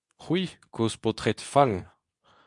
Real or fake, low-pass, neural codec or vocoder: fake; 10.8 kHz; codec, 24 kHz, 0.9 kbps, WavTokenizer, medium speech release version 2